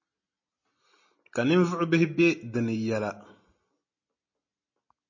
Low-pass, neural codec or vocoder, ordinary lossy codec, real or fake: 7.2 kHz; none; MP3, 32 kbps; real